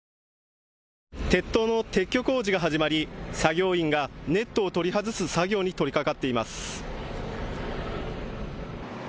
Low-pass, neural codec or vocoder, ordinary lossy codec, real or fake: none; none; none; real